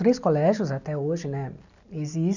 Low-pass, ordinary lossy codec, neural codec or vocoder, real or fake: 7.2 kHz; none; none; real